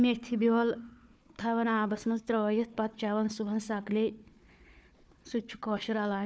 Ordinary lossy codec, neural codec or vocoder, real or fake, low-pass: none; codec, 16 kHz, 4 kbps, FunCodec, trained on Chinese and English, 50 frames a second; fake; none